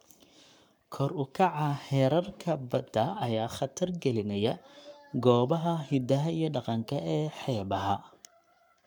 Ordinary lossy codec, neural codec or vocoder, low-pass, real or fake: none; codec, 44.1 kHz, 7.8 kbps, Pupu-Codec; 19.8 kHz; fake